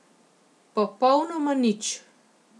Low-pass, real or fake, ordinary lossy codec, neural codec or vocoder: none; real; none; none